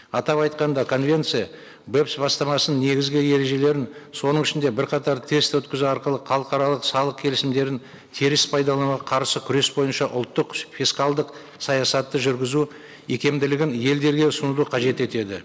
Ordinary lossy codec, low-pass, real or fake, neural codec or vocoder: none; none; real; none